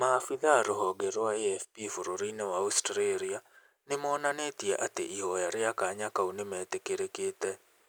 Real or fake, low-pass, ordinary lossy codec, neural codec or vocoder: real; none; none; none